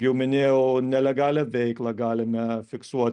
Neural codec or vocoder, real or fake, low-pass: none; real; 10.8 kHz